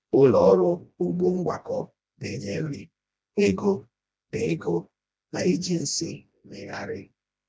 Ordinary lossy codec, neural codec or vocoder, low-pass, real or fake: none; codec, 16 kHz, 1 kbps, FreqCodec, smaller model; none; fake